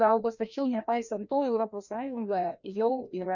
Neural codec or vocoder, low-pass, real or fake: codec, 16 kHz, 1 kbps, FreqCodec, larger model; 7.2 kHz; fake